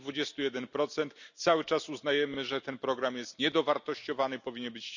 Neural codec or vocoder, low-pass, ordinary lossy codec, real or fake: none; 7.2 kHz; none; real